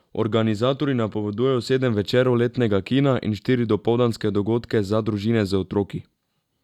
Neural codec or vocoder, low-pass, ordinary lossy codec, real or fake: none; 19.8 kHz; none; real